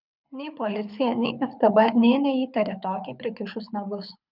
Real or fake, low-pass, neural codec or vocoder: fake; 5.4 kHz; codec, 24 kHz, 6 kbps, HILCodec